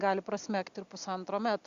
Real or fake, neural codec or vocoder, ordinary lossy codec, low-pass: real; none; Opus, 64 kbps; 7.2 kHz